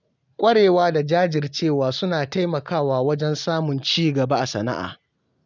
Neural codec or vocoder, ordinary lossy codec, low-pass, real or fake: none; none; 7.2 kHz; real